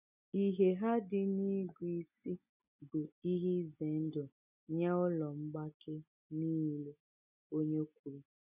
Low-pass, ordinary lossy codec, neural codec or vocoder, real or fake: 3.6 kHz; none; none; real